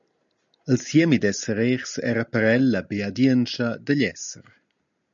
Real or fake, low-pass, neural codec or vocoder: real; 7.2 kHz; none